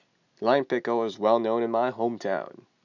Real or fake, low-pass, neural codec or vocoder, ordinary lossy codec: real; 7.2 kHz; none; none